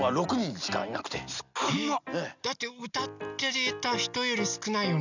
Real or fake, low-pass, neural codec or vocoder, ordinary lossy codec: real; 7.2 kHz; none; none